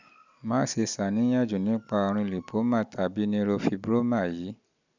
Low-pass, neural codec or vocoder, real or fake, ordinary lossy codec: 7.2 kHz; none; real; none